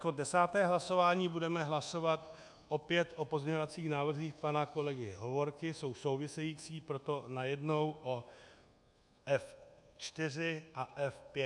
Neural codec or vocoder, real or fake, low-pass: codec, 24 kHz, 1.2 kbps, DualCodec; fake; 10.8 kHz